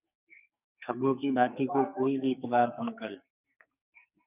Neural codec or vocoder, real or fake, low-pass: codec, 44.1 kHz, 2.6 kbps, SNAC; fake; 3.6 kHz